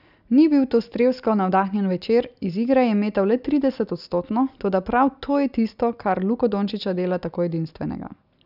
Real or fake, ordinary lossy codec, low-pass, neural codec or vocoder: real; none; 5.4 kHz; none